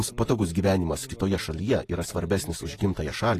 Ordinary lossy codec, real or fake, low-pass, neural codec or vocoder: AAC, 48 kbps; real; 14.4 kHz; none